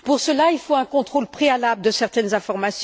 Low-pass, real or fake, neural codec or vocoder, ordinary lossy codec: none; real; none; none